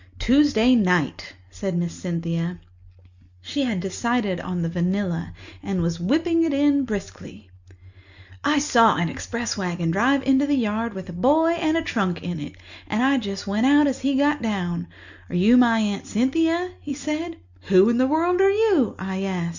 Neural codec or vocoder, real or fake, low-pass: none; real; 7.2 kHz